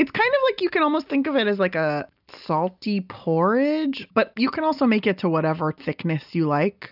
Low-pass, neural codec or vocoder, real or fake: 5.4 kHz; none; real